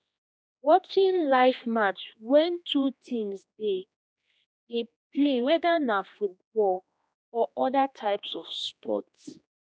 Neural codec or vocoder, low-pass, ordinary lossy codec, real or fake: codec, 16 kHz, 2 kbps, X-Codec, HuBERT features, trained on general audio; none; none; fake